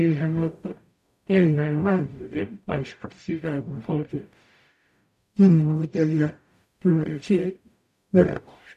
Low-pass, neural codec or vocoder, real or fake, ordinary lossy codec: 14.4 kHz; codec, 44.1 kHz, 0.9 kbps, DAC; fake; none